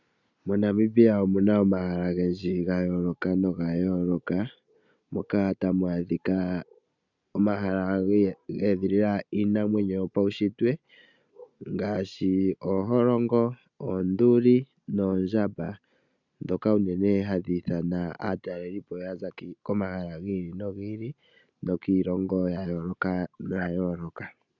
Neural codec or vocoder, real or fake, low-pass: none; real; 7.2 kHz